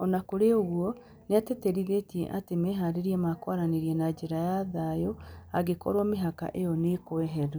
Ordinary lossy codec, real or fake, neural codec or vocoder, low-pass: none; real; none; none